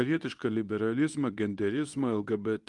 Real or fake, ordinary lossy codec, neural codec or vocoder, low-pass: fake; Opus, 32 kbps; codec, 24 kHz, 0.9 kbps, WavTokenizer, medium speech release version 2; 10.8 kHz